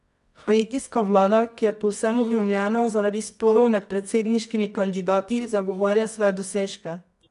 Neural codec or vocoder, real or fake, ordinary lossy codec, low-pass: codec, 24 kHz, 0.9 kbps, WavTokenizer, medium music audio release; fake; none; 10.8 kHz